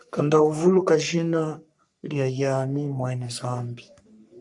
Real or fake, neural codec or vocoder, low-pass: fake; codec, 44.1 kHz, 3.4 kbps, Pupu-Codec; 10.8 kHz